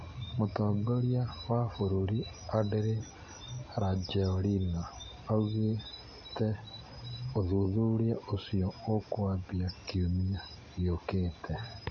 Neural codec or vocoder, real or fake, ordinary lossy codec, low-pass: none; real; MP3, 32 kbps; 7.2 kHz